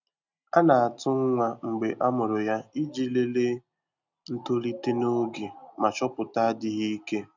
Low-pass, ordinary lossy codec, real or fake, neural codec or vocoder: 7.2 kHz; none; real; none